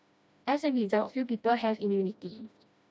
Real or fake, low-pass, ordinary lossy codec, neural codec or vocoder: fake; none; none; codec, 16 kHz, 1 kbps, FreqCodec, smaller model